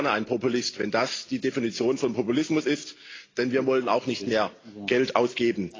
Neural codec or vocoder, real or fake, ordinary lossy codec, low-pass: none; real; AAC, 32 kbps; 7.2 kHz